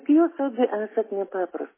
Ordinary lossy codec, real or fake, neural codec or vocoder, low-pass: MP3, 16 kbps; real; none; 3.6 kHz